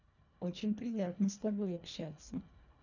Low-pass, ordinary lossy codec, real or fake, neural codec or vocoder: 7.2 kHz; none; fake; codec, 24 kHz, 1.5 kbps, HILCodec